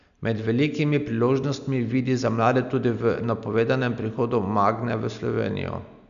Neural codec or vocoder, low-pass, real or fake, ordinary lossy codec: none; 7.2 kHz; real; none